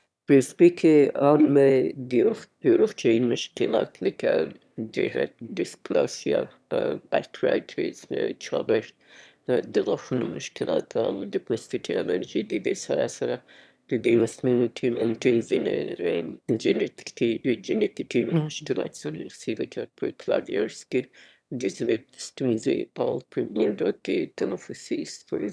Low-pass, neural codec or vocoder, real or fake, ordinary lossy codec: none; autoencoder, 22.05 kHz, a latent of 192 numbers a frame, VITS, trained on one speaker; fake; none